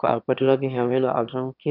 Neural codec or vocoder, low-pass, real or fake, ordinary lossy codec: autoencoder, 22.05 kHz, a latent of 192 numbers a frame, VITS, trained on one speaker; 5.4 kHz; fake; none